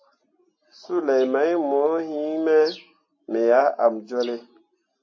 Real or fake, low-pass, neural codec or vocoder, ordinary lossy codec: real; 7.2 kHz; none; MP3, 32 kbps